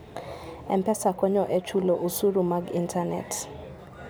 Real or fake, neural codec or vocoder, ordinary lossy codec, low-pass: real; none; none; none